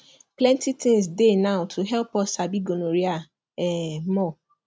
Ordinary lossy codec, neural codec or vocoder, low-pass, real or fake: none; none; none; real